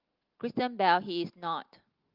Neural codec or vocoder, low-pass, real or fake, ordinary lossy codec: none; 5.4 kHz; real; Opus, 32 kbps